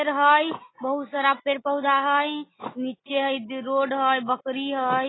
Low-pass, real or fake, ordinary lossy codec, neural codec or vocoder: 7.2 kHz; real; AAC, 16 kbps; none